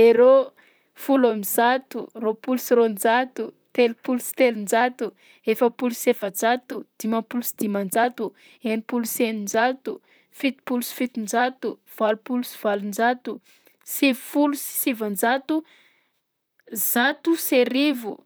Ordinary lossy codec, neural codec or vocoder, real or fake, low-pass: none; vocoder, 44.1 kHz, 128 mel bands, Pupu-Vocoder; fake; none